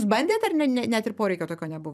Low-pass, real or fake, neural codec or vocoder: 14.4 kHz; fake; vocoder, 44.1 kHz, 128 mel bands every 256 samples, BigVGAN v2